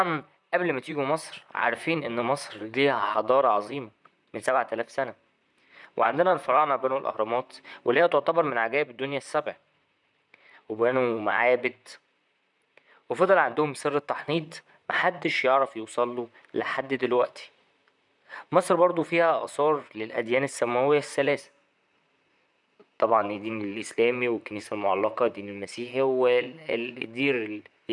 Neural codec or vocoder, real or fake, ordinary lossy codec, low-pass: vocoder, 44.1 kHz, 128 mel bands, Pupu-Vocoder; fake; none; 10.8 kHz